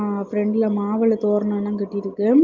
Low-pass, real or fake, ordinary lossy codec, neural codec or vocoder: 7.2 kHz; real; Opus, 24 kbps; none